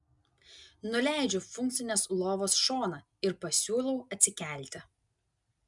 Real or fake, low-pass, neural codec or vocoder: real; 10.8 kHz; none